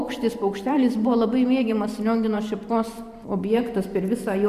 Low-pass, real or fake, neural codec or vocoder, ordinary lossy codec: 14.4 kHz; fake; vocoder, 44.1 kHz, 128 mel bands every 512 samples, BigVGAN v2; Opus, 64 kbps